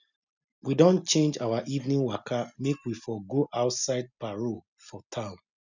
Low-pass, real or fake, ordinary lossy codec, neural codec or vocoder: 7.2 kHz; real; none; none